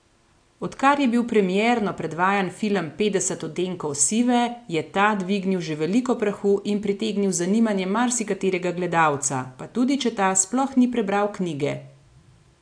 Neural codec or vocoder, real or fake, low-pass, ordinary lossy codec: none; real; 9.9 kHz; AAC, 64 kbps